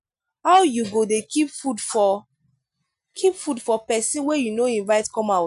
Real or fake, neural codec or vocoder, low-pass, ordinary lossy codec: real; none; 10.8 kHz; none